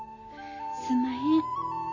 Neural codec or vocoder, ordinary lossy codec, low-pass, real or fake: none; none; 7.2 kHz; real